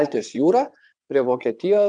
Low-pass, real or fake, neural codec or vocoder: 9.9 kHz; fake; vocoder, 22.05 kHz, 80 mel bands, WaveNeXt